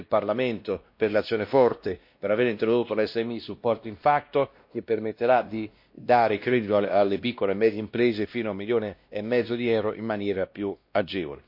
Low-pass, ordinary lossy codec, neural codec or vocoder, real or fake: 5.4 kHz; MP3, 32 kbps; codec, 16 kHz, 1 kbps, X-Codec, WavLM features, trained on Multilingual LibriSpeech; fake